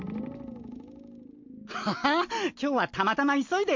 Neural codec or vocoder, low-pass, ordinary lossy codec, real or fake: none; 7.2 kHz; MP3, 48 kbps; real